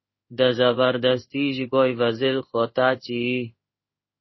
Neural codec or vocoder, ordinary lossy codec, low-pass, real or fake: codec, 16 kHz in and 24 kHz out, 1 kbps, XY-Tokenizer; MP3, 24 kbps; 7.2 kHz; fake